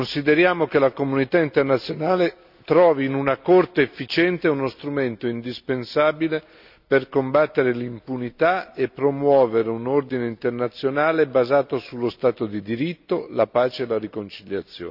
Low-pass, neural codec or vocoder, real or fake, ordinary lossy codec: 5.4 kHz; none; real; none